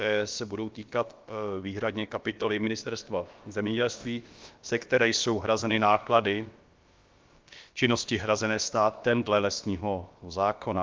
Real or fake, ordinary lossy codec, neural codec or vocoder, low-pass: fake; Opus, 32 kbps; codec, 16 kHz, about 1 kbps, DyCAST, with the encoder's durations; 7.2 kHz